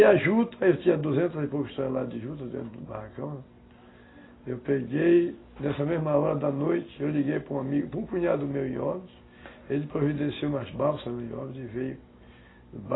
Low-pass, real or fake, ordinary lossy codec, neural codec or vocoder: 7.2 kHz; real; AAC, 16 kbps; none